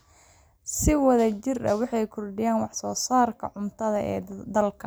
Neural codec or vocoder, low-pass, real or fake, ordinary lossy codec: none; none; real; none